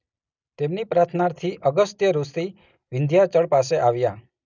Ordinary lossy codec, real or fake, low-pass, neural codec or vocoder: none; real; 7.2 kHz; none